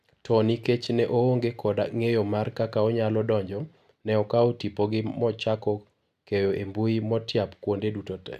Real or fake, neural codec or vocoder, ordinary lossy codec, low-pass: real; none; none; 14.4 kHz